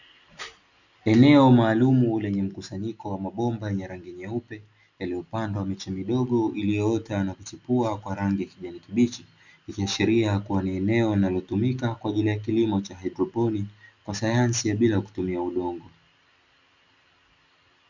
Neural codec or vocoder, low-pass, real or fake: none; 7.2 kHz; real